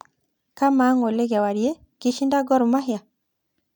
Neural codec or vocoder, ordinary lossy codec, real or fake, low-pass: none; none; real; 19.8 kHz